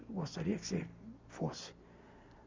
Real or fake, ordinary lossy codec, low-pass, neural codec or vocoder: real; MP3, 64 kbps; 7.2 kHz; none